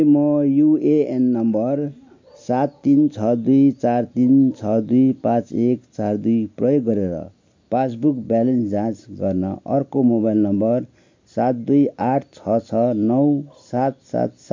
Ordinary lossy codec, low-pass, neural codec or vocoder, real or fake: MP3, 48 kbps; 7.2 kHz; none; real